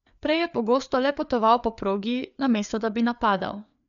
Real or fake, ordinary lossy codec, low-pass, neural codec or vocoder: fake; none; 7.2 kHz; codec, 16 kHz, 4 kbps, FreqCodec, larger model